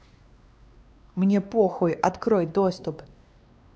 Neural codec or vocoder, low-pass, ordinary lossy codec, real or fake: codec, 16 kHz, 2 kbps, X-Codec, WavLM features, trained on Multilingual LibriSpeech; none; none; fake